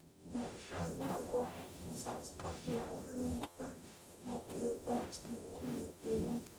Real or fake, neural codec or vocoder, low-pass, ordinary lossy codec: fake; codec, 44.1 kHz, 0.9 kbps, DAC; none; none